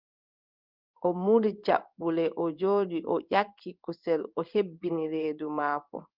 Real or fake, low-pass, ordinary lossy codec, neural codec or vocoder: fake; 5.4 kHz; Opus, 24 kbps; codec, 16 kHz in and 24 kHz out, 1 kbps, XY-Tokenizer